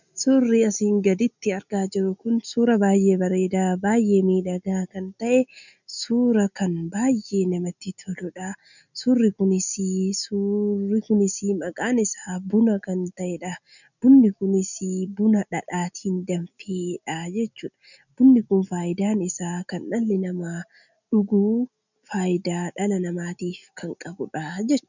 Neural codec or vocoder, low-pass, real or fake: none; 7.2 kHz; real